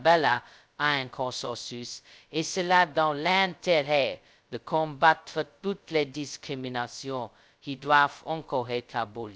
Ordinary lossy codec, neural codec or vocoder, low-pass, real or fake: none; codec, 16 kHz, 0.2 kbps, FocalCodec; none; fake